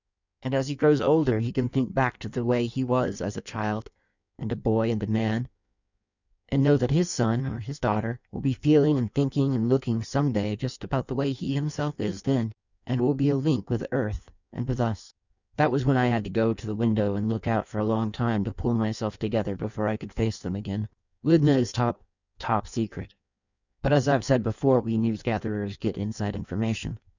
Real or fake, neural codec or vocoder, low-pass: fake; codec, 16 kHz in and 24 kHz out, 1.1 kbps, FireRedTTS-2 codec; 7.2 kHz